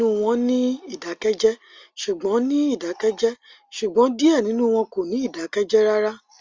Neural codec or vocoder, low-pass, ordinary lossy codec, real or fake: none; 7.2 kHz; Opus, 32 kbps; real